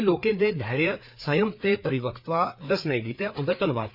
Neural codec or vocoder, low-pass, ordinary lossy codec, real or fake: codec, 16 kHz, 4 kbps, FreqCodec, larger model; 5.4 kHz; none; fake